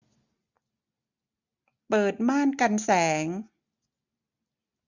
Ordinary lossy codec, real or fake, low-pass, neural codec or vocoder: none; real; 7.2 kHz; none